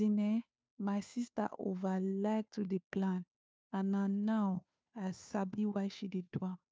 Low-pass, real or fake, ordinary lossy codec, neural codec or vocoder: none; fake; none; codec, 16 kHz, 2 kbps, FunCodec, trained on Chinese and English, 25 frames a second